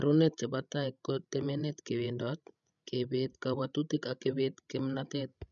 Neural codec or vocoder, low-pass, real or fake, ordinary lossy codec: codec, 16 kHz, 16 kbps, FreqCodec, larger model; 7.2 kHz; fake; none